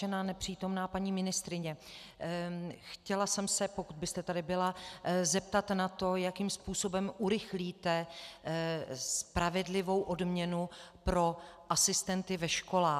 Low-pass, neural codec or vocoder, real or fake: 14.4 kHz; none; real